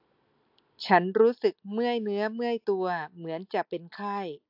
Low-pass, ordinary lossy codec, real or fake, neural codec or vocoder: 5.4 kHz; AAC, 48 kbps; real; none